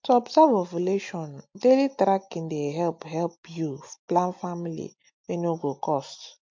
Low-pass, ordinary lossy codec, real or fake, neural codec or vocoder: 7.2 kHz; MP3, 48 kbps; real; none